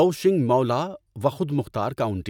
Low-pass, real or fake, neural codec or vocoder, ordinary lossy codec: 19.8 kHz; real; none; none